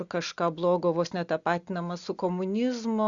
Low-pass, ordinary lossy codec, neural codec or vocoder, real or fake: 7.2 kHz; Opus, 64 kbps; none; real